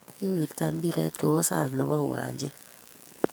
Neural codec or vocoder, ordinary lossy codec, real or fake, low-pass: codec, 44.1 kHz, 2.6 kbps, SNAC; none; fake; none